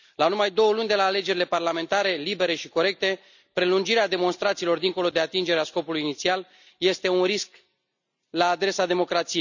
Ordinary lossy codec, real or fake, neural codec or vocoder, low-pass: none; real; none; 7.2 kHz